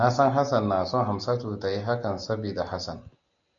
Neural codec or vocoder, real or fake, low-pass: none; real; 7.2 kHz